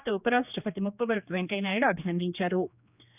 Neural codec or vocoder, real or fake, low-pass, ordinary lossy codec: codec, 16 kHz, 2 kbps, X-Codec, HuBERT features, trained on general audio; fake; 3.6 kHz; none